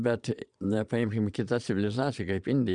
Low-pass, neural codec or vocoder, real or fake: 9.9 kHz; vocoder, 22.05 kHz, 80 mel bands, Vocos; fake